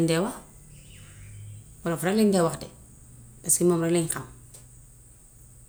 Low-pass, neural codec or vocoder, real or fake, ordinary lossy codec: none; none; real; none